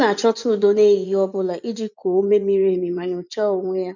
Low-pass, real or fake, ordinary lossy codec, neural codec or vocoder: 7.2 kHz; fake; none; vocoder, 24 kHz, 100 mel bands, Vocos